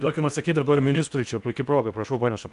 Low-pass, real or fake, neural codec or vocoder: 10.8 kHz; fake; codec, 16 kHz in and 24 kHz out, 0.8 kbps, FocalCodec, streaming, 65536 codes